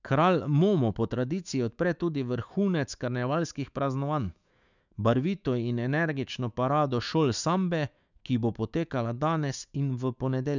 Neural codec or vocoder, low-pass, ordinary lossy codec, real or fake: autoencoder, 48 kHz, 128 numbers a frame, DAC-VAE, trained on Japanese speech; 7.2 kHz; none; fake